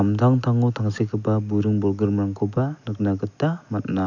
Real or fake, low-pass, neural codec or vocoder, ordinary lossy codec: real; 7.2 kHz; none; none